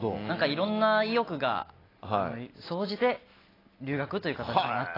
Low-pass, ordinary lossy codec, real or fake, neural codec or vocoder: 5.4 kHz; AAC, 24 kbps; real; none